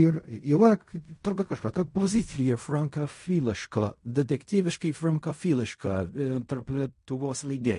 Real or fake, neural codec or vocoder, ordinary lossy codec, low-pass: fake; codec, 16 kHz in and 24 kHz out, 0.4 kbps, LongCat-Audio-Codec, fine tuned four codebook decoder; MP3, 48 kbps; 10.8 kHz